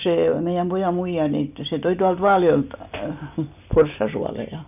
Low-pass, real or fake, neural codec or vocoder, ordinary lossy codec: 3.6 kHz; real; none; none